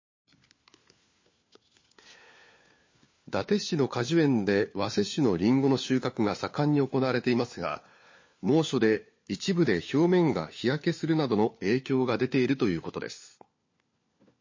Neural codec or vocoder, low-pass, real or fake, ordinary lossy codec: codec, 16 kHz, 4 kbps, FunCodec, trained on LibriTTS, 50 frames a second; 7.2 kHz; fake; MP3, 32 kbps